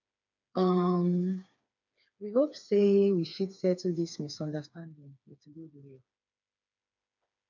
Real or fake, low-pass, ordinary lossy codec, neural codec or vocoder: fake; 7.2 kHz; none; codec, 16 kHz, 4 kbps, FreqCodec, smaller model